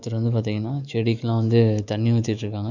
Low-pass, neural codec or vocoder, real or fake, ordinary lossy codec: 7.2 kHz; autoencoder, 48 kHz, 128 numbers a frame, DAC-VAE, trained on Japanese speech; fake; none